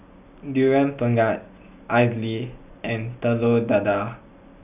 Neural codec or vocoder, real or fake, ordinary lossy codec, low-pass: none; real; none; 3.6 kHz